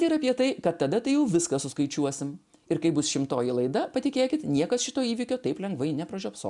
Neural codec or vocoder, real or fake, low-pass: none; real; 10.8 kHz